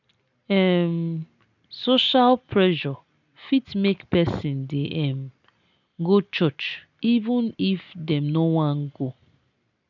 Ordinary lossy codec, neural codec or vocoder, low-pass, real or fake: none; none; 7.2 kHz; real